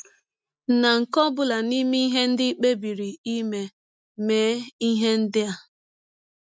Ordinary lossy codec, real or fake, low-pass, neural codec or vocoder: none; real; none; none